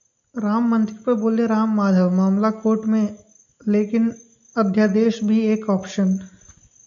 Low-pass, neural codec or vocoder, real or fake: 7.2 kHz; none; real